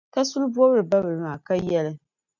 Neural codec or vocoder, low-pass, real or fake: none; 7.2 kHz; real